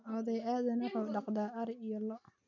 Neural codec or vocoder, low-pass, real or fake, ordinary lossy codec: none; 7.2 kHz; real; none